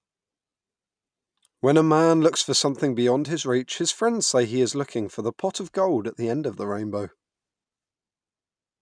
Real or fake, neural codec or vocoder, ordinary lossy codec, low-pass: real; none; none; 9.9 kHz